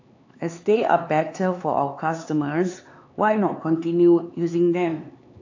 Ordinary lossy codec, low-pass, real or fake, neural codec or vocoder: AAC, 48 kbps; 7.2 kHz; fake; codec, 16 kHz, 4 kbps, X-Codec, HuBERT features, trained on LibriSpeech